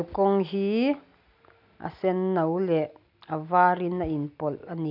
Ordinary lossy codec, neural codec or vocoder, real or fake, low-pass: none; none; real; 5.4 kHz